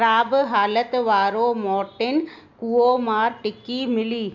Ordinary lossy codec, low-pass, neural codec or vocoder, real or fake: none; 7.2 kHz; none; real